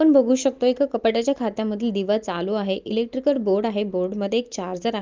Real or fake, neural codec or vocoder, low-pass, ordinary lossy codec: real; none; 7.2 kHz; Opus, 32 kbps